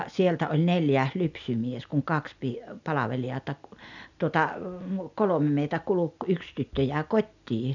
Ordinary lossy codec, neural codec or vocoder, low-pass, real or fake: none; none; 7.2 kHz; real